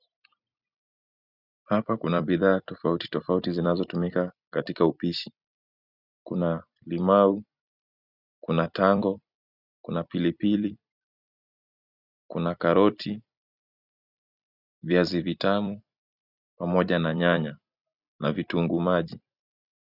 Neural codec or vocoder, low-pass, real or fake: vocoder, 44.1 kHz, 128 mel bands every 256 samples, BigVGAN v2; 5.4 kHz; fake